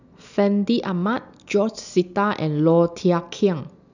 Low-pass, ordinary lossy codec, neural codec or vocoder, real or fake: 7.2 kHz; none; none; real